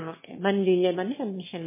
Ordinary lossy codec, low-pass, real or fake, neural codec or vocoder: MP3, 16 kbps; 3.6 kHz; fake; autoencoder, 22.05 kHz, a latent of 192 numbers a frame, VITS, trained on one speaker